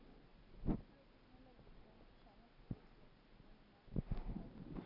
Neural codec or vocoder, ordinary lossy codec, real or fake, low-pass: none; none; real; 5.4 kHz